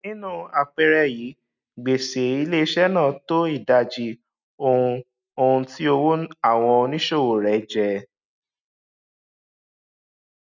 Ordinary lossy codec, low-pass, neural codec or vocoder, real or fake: none; 7.2 kHz; none; real